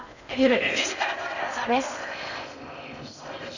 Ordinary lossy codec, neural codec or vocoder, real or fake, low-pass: none; codec, 16 kHz in and 24 kHz out, 0.6 kbps, FocalCodec, streaming, 4096 codes; fake; 7.2 kHz